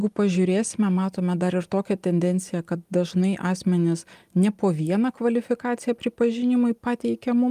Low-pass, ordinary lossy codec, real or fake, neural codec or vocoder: 14.4 kHz; Opus, 32 kbps; real; none